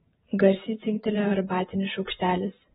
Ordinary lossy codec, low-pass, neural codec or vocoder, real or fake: AAC, 16 kbps; 19.8 kHz; vocoder, 44.1 kHz, 128 mel bands every 512 samples, BigVGAN v2; fake